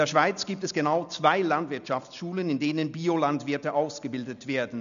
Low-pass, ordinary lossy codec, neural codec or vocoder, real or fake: 7.2 kHz; none; none; real